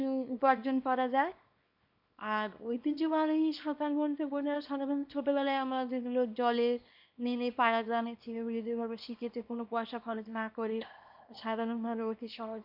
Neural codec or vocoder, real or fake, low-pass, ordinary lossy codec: codec, 24 kHz, 0.9 kbps, WavTokenizer, small release; fake; 5.4 kHz; none